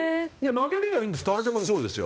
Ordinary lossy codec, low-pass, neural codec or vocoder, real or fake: none; none; codec, 16 kHz, 1 kbps, X-Codec, HuBERT features, trained on balanced general audio; fake